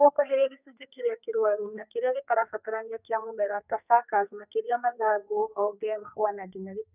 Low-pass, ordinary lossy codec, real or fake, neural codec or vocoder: 3.6 kHz; none; fake; codec, 32 kHz, 1.9 kbps, SNAC